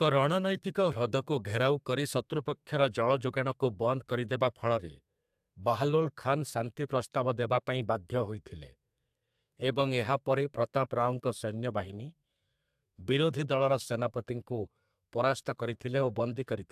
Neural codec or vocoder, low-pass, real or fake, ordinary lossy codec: codec, 32 kHz, 1.9 kbps, SNAC; 14.4 kHz; fake; AAC, 96 kbps